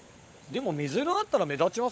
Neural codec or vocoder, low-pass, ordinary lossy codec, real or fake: codec, 16 kHz, 16 kbps, FunCodec, trained on LibriTTS, 50 frames a second; none; none; fake